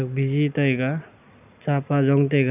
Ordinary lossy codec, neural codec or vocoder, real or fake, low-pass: none; none; real; 3.6 kHz